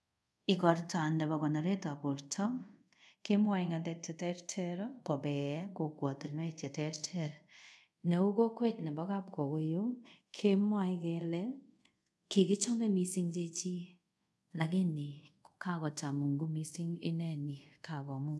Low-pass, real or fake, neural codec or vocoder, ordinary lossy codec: none; fake; codec, 24 kHz, 0.5 kbps, DualCodec; none